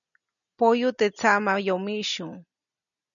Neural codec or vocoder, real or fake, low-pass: none; real; 7.2 kHz